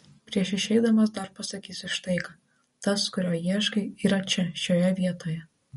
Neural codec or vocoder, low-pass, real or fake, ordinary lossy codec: none; 19.8 kHz; real; MP3, 48 kbps